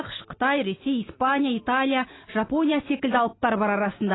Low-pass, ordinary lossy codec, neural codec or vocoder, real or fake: 7.2 kHz; AAC, 16 kbps; none; real